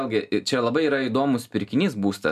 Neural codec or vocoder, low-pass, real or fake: none; 14.4 kHz; real